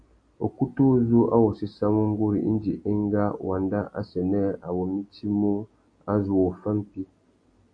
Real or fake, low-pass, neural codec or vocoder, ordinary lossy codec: real; 9.9 kHz; none; AAC, 48 kbps